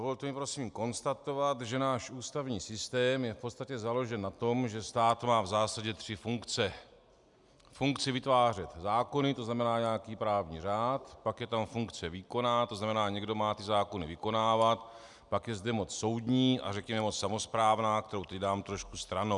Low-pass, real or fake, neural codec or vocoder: 10.8 kHz; real; none